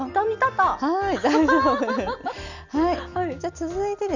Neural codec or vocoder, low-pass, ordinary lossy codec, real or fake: none; 7.2 kHz; none; real